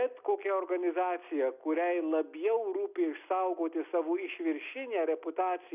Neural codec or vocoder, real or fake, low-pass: none; real; 3.6 kHz